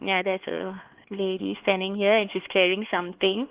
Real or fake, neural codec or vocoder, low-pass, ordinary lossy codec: fake; codec, 16 kHz, 4 kbps, X-Codec, HuBERT features, trained on LibriSpeech; 3.6 kHz; Opus, 16 kbps